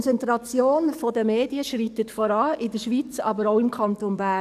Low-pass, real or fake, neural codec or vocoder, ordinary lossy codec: 14.4 kHz; fake; codec, 44.1 kHz, 7.8 kbps, DAC; none